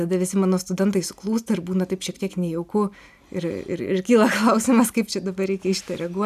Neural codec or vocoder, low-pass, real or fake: none; 14.4 kHz; real